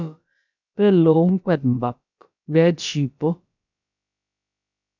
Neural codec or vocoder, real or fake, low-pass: codec, 16 kHz, about 1 kbps, DyCAST, with the encoder's durations; fake; 7.2 kHz